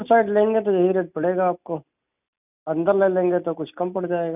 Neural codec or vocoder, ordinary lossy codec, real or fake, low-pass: none; none; real; 3.6 kHz